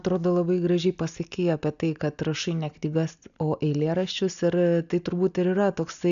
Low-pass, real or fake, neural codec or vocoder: 7.2 kHz; real; none